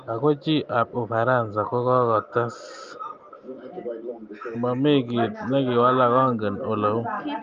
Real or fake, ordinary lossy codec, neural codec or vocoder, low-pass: real; Opus, 32 kbps; none; 7.2 kHz